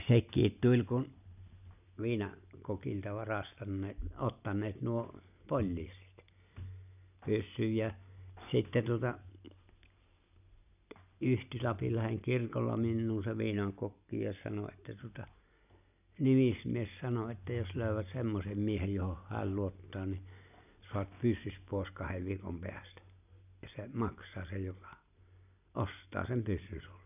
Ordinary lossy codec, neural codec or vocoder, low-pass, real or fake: none; none; 3.6 kHz; real